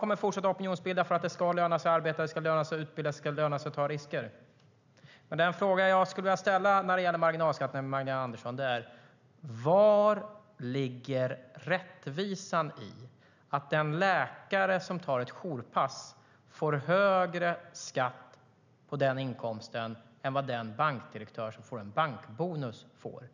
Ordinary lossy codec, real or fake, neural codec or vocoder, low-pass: none; real; none; 7.2 kHz